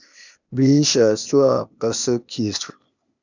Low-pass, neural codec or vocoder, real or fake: 7.2 kHz; codec, 16 kHz, 0.8 kbps, ZipCodec; fake